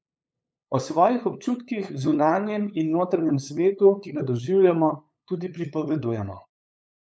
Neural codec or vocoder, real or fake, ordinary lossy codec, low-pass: codec, 16 kHz, 8 kbps, FunCodec, trained on LibriTTS, 25 frames a second; fake; none; none